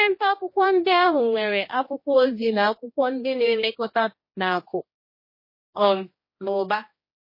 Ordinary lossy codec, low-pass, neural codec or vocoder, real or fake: MP3, 24 kbps; 5.4 kHz; codec, 16 kHz, 1 kbps, X-Codec, HuBERT features, trained on general audio; fake